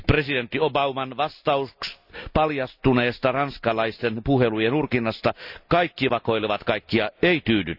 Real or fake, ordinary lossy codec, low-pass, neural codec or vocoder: real; none; 5.4 kHz; none